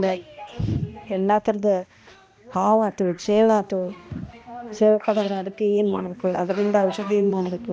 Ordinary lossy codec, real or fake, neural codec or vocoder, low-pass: none; fake; codec, 16 kHz, 1 kbps, X-Codec, HuBERT features, trained on balanced general audio; none